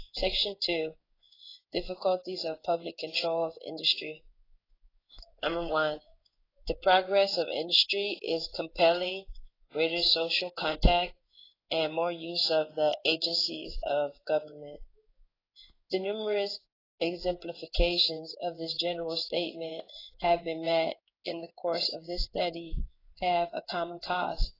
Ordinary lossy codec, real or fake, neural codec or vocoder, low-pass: AAC, 24 kbps; fake; codec, 16 kHz in and 24 kHz out, 1 kbps, XY-Tokenizer; 5.4 kHz